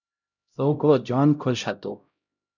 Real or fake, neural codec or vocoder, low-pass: fake; codec, 16 kHz, 0.5 kbps, X-Codec, HuBERT features, trained on LibriSpeech; 7.2 kHz